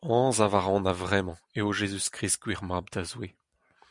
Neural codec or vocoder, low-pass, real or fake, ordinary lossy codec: none; 10.8 kHz; real; MP3, 96 kbps